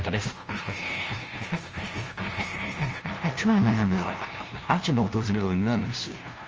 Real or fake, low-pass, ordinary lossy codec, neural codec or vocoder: fake; 7.2 kHz; Opus, 24 kbps; codec, 16 kHz, 0.5 kbps, FunCodec, trained on LibriTTS, 25 frames a second